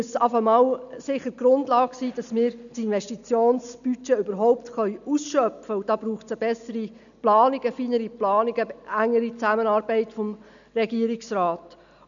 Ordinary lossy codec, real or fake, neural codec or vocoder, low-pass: none; real; none; 7.2 kHz